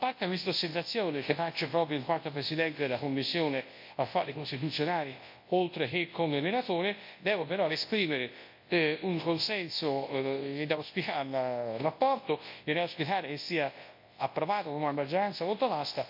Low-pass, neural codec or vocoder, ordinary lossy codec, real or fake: 5.4 kHz; codec, 24 kHz, 0.9 kbps, WavTokenizer, large speech release; MP3, 32 kbps; fake